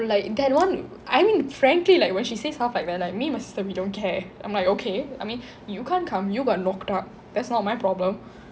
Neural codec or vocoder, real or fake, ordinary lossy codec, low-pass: none; real; none; none